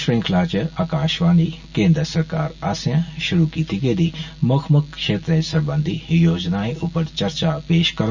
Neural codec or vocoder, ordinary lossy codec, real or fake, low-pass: none; none; real; 7.2 kHz